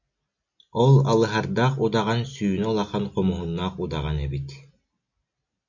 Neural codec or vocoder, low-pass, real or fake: none; 7.2 kHz; real